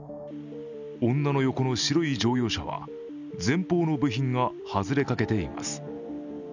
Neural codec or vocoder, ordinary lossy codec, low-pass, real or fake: none; none; 7.2 kHz; real